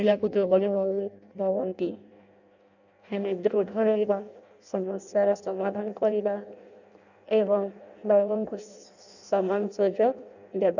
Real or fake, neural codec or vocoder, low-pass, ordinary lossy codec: fake; codec, 16 kHz in and 24 kHz out, 0.6 kbps, FireRedTTS-2 codec; 7.2 kHz; none